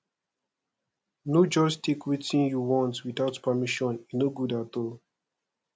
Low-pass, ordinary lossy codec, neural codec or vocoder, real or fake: none; none; none; real